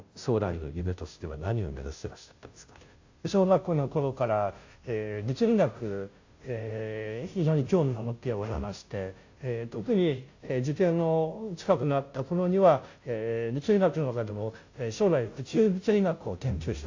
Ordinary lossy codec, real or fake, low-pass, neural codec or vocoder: AAC, 48 kbps; fake; 7.2 kHz; codec, 16 kHz, 0.5 kbps, FunCodec, trained on Chinese and English, 25 frames a second